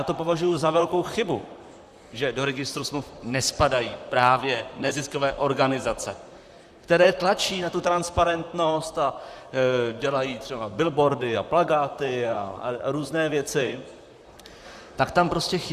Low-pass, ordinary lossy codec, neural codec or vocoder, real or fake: 14.4 kHz; Opus, 64 kbps; vocoder, 44.1 kHz, 128 mel bands, Pupu-Vocoder; fake